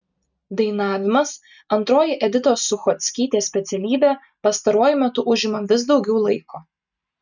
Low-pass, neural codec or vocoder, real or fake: 7.2 kHz; none; real